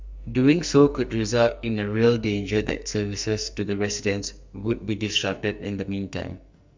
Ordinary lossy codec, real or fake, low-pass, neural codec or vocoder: MP3, 64 kbps; fake; 7.2 kHz; codec, 44.1 kHz, 2.6 kbps, SNAC